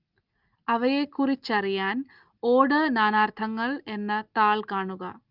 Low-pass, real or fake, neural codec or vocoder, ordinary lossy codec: 5.4 kHz; real; none; Opus, 24 kbps